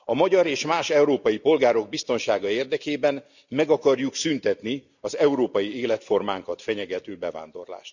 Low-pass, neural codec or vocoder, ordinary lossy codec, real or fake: 7.2 kHz; none; none; real